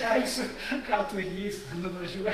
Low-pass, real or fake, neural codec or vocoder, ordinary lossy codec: 14.4 kHz; fake; codec, 32 kHz, 1.9 kbps, SNAC; Opus, 64 kbps